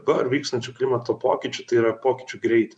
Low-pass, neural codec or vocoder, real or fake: 9.9 kHz; vocoder, 24 kHz, 100 mel bands, Vocos; fake